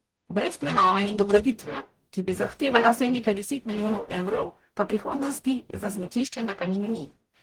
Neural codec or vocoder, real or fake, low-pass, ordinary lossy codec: codec, 44.1 kHz, 0.9 kbps, DAC; fake; 19.8 kHz; Opus, 24 kbps